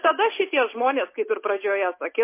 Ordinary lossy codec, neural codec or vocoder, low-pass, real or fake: MP3, 24 kbps; none; 3.6 kHz; real